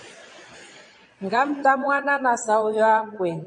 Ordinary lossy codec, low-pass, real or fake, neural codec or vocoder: MP3, 48 kbps; 9.9 kHz; fake; vocoder, 22.05 kHz, 80 mel bands, Vocos